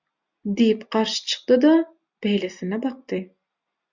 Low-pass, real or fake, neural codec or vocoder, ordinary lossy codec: 7.2 kHz; real; none; MP3, 64 kbps